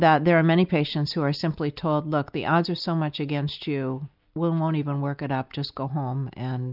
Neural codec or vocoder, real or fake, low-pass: none; real; 5.4 kHz